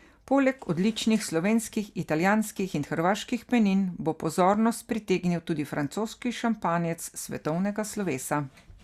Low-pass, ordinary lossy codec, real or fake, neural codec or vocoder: 14.4 kHz; Opus, 64 kbps; real; none